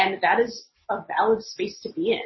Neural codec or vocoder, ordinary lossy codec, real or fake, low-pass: none; MP3, 24 kbps; real; 7.2 kHz